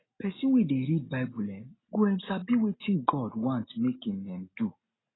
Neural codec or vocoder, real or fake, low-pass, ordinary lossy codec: none; real; 7.2 kHz; AAC, 16 kbps